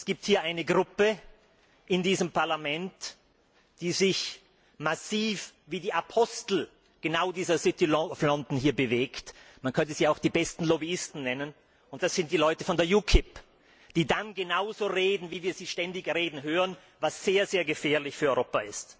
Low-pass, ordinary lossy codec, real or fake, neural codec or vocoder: none; none; real; none